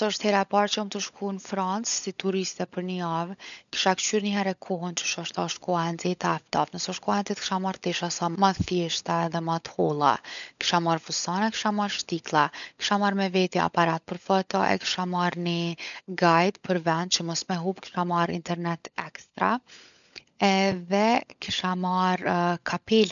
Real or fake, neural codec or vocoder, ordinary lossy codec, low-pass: fake; codec, 16 kHz, 16 kbps, FunCodec, trained on LibriTTS, 50 frames a second; none; 7.2 kHz